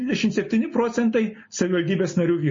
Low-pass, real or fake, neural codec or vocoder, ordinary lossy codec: 7.2 kHz; real; none; MP3, 32 kbps